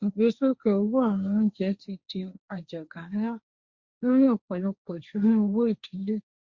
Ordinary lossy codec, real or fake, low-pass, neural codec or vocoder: Opus, 64 kbps; fake; 7.2 kHz; codec, 16 kHz, 1.1 kbps, Voila-Tokenizer